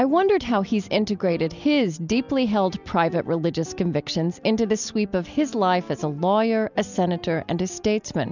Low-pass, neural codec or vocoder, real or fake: 7.2 kHz; none; real